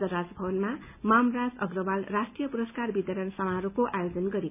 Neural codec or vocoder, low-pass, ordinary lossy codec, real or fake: none; 3.6 kHz; none; real